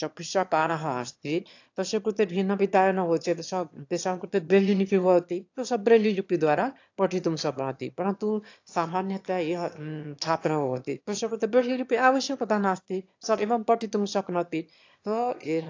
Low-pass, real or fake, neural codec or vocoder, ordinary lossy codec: 7.2 kHz; fake; autoencoder, 22.05 kHz, a latent of 192 numbers a frame, VITS, trained on one speaker; AAC, 48 kbps